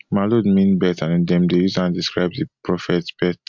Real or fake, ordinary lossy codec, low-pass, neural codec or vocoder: real; MP3, 64 kbps; 7.2 kHz; none